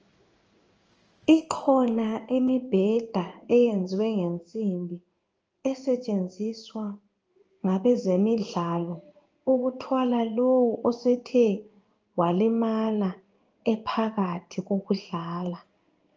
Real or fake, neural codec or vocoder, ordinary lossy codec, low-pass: fake; codec, 16 kHz in and 24 kHz out, 1 kbps, XY-Tokenizer; Opus, 24 kbps; 7.2 kHz